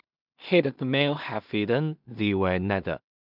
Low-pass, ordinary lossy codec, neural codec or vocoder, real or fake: 5.4 kHz; none; codec, 16 kHz in and 24 kHz out, 0.4 kbps, LongCat-Audio-Codec, two codebook decoder; fake